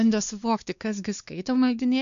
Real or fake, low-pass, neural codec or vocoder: fake; 7.2 kHz; codec, 16 kHz, 2 kbps, X-Codec, WavLM features, trained on Multilingual LibriSpeech